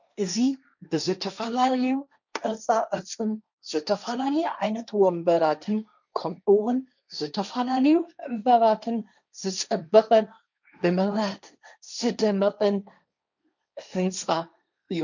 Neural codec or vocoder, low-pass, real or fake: codec, 16 kHz, 1.1 kbps, Voila-Tokenizer; 7.2 kHz; fake